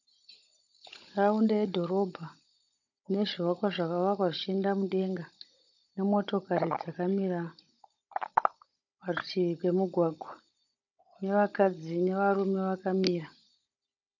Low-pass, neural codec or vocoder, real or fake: 7.2 kHz; codec, 16 kHz, 16 kbps, FunCodec, trained on Chinese and English, 50 frames a second; fake